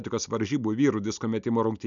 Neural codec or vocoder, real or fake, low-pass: none; real; 7.2 kHz